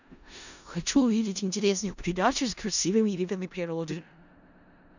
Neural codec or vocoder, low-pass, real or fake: codec, 16 kHz in and 24 kHz out, 0.4 kbps, LongCat-Audio-Codec, four codebook decoder; 7.2 kHz; fake